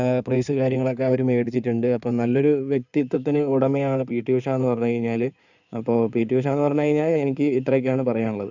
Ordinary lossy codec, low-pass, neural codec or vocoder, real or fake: none; 7.2 kHz; codec, 16 kHz in and 24 kHz out, 2.2 kbps, FireRedTTS-2 codec; fake